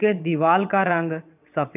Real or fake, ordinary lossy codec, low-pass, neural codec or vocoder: fake; none; 3.6 kHz; vocoder, 22.05 kHz, 80 mel bands, WaveNeXt